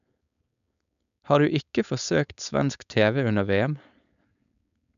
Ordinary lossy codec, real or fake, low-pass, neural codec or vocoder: none; fake; 7.2 kHz; codec, 16 kHz, 4.8 kbps, FACodec